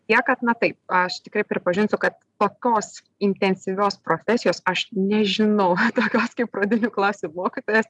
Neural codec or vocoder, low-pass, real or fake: none; 10.8 kHz; real